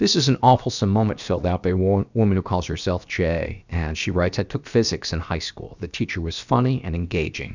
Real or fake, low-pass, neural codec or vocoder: fake; 7.2 kHz; codec, 16 kHz, about 1 kbps, DyCAST, with the encoder's durations